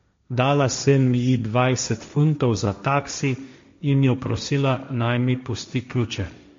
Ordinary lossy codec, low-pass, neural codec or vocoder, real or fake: MP3, 48 kbps; 7.2 kHz; codec, 16 kHz, 1.1 kbps, Voila-Tokenizer; fake